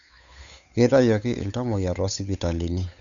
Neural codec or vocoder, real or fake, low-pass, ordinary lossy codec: codec, 16 kHz, 8 kbps, FunCodec, trained on Chinese and English, 25 frames a second; fake; 7.2 kHz; none